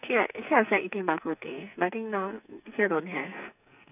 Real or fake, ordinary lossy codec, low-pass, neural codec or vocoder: fake; none; 3.6 kHz; codec, 44.1 kHz, 2.6 kbps, SNAC